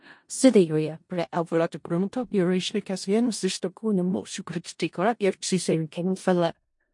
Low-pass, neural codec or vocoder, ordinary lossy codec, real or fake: 10.8 kHz; codec, 16 kHz in and 24 kHz out, 0.4 kbps, LongCat-Audio-Codec, four codebook decoder; MP3, 48 kbps; fake